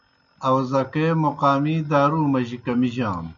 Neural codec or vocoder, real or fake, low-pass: none; real; 7.2 kHz